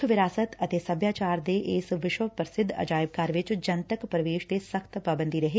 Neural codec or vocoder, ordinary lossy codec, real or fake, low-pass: none; none; real; none